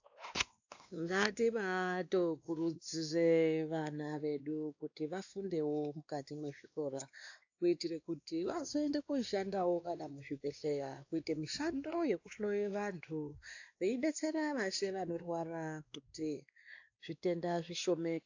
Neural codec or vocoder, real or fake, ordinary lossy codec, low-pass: codec, 16 kHz, 2 kbps, X-Codec, WavLM features, trained on Multilingual LibriSpeech; fake; AAC, 48 kbps; 7.2 kHz